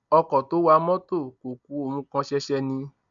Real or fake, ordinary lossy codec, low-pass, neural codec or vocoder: real; none; 7.2 kHz; none